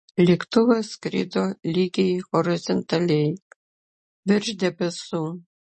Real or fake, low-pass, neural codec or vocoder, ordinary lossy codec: real; 10.8 kHz; none; MP3, 32 kbps